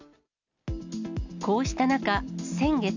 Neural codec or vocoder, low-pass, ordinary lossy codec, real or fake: none; 7.2 kHz; none; real